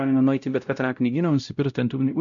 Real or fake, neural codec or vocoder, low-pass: fake; codec, 16 kHz, 0.5 kbps, X-Codec, WavLM features, trained on Multilingual LibriSpeech; 7.2 kHz